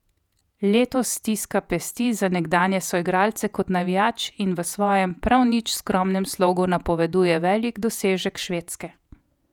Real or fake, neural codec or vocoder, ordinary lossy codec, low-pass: fake; vocoder, 48 kHz, 128 mel bands, Vocos; none; 19.8 kHz